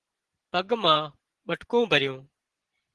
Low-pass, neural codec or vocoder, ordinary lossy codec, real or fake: 10.8 kHz; vocoder, 24 kHz, 100 mel bands, Vocos; Opus, 16 kbps; fake